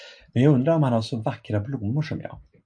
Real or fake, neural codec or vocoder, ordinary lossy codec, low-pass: real; none; MP3, 96 kbps; 9.9 kHz